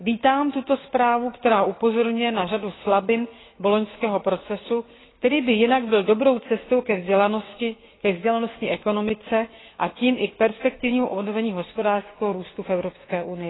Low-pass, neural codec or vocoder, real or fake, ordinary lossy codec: 7.2 kHz; codec, 16 kHz, 6 kbps, DAC; fake; AAC, 16 kbps